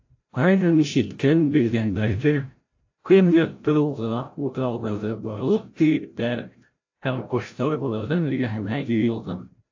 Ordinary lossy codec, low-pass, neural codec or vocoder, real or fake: AAC, 48 kbps; 7.2 kHz; codec, 16 kHz, 0.5 kbps, FreqCodec, larger model; fake